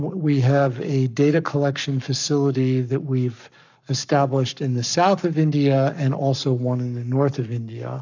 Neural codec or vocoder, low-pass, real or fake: codec, 44.1 kHz, 7.8 kbps, Pupu-Codec; 7.2 kHz; fake